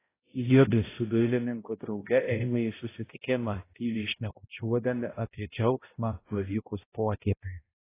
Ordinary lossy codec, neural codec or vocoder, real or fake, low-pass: AAC, 16 kbps; codec, 16 kHz, 0.5 kbps, X-Codec, HuBERT features, trained on balanced general audio; fake; 3.6 kHz